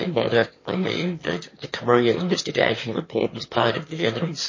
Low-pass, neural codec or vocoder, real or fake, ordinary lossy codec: 7.2 kHz; autoencoder, 22.05 kHz, a latent of 192 numbers a frame, VITS, trained on one speaker; fake; MP3, 32 kbps